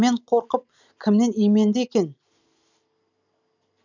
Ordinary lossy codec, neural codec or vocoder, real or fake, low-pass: none; none; real; 7.2 kHz